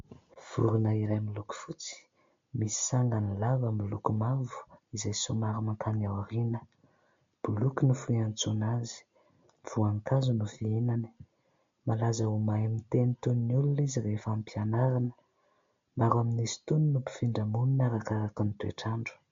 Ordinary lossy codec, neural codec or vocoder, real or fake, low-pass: MP3, 48 kbps; none; real; 7.2 kHz